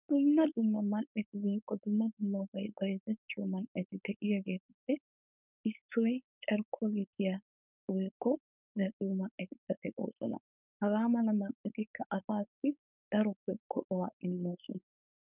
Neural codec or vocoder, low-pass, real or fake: codec, 16 kHz, 4.8 kbps, FACodec; 3.6 kHz; fake